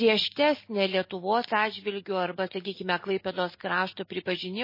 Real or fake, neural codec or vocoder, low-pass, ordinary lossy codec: real; none; 5.4 kHz; MP3, 24 kbps